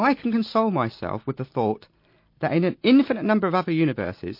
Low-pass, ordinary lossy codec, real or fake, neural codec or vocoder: 5.4 kHz; MP3, 32 kbps; real; none